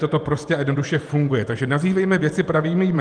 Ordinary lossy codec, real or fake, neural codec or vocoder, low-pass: Opus, 32 kbps; fake; vocoder, 48 kHz, 128 mel bands, Vocos; 9.9 kHz